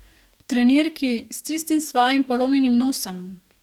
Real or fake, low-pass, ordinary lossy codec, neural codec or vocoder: fake; 19.8 kHz; none; codec, 44.1 kHz, 2.6 kbps, DAC